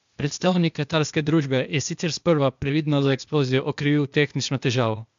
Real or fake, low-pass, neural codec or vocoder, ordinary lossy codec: fake; 7.2 kHz; codec, 16 kHz, 0.8 kbps, ZipCodec; MP3, 64 kbps